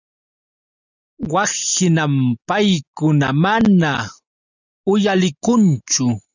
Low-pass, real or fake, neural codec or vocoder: 7.2 kHz; real; none